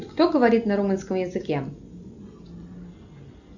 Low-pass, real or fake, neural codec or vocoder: 7.2 kHz; real; none